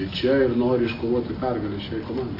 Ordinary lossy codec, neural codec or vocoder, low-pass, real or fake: AAC, 24 kbps; none; 5.4 kHz; real